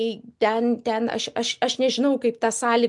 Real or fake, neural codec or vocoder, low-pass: real; none; 10.8 kHz